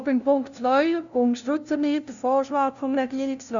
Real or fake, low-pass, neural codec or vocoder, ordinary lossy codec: fake; 7.2 kHz; codec, 16 kHz, 0.5 kbps, FunCodec, trained on LibriTTS, 25 frames a second; none